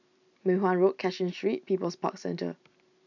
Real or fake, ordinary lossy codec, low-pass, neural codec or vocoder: real; none; 7.2 kHz; none